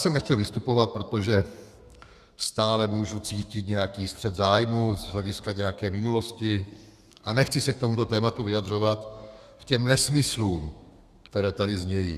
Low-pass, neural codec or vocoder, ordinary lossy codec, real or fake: 14.4 kHz; codec, 32 kHz, 1.9 kbps, SNAC; Opus, 64 kbps; fake